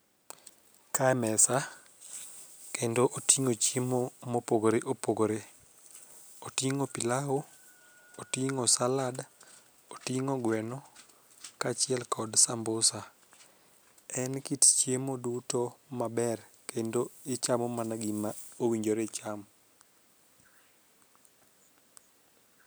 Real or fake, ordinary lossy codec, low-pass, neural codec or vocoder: real; none; none; none